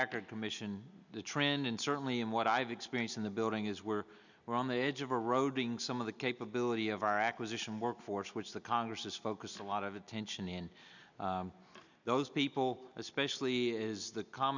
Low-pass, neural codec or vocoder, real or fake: 7.2 kHz; none; real